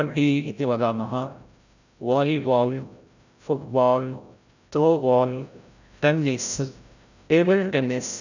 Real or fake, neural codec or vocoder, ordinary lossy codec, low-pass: fake; codec, 16 kHz, 0.5 kbps, FreqCodec, larger model; none; 7.2 kHz